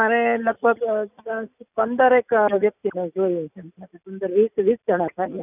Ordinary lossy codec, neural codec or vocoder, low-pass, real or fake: none; none; 3.6 kHz; real